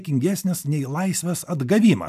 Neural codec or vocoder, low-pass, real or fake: none; 14.4 kHz; real